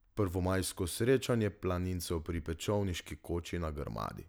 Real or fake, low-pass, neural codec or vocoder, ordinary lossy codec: real; none; none; none